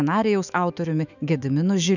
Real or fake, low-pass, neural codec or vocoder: fake; 7.2 kHz; autoencoder, 48 kHz, 128 numbers a frame, DAC-VAE, trained on Japanese speech